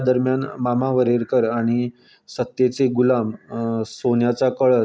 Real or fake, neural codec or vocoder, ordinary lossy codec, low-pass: real; none; none; none